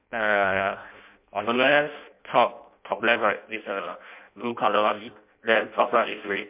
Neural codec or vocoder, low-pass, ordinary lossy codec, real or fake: codec, 16 kHz in and 24 kHz out, 0.6 kbps, FireRedTTS-2 codec; 3.6 kHz; MP3, 32 kbps; fake